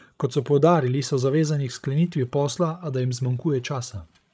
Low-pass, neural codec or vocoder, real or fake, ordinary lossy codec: none; codec, 16 kHz, 16 kbps, FreqCodec, larger model; fake; none